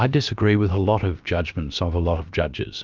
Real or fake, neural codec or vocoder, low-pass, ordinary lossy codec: fake; codec, 16 kHz, about 1 kbps, DyCAST, with the encoder's durations; 7.2 kHz; Opus, 24 kbps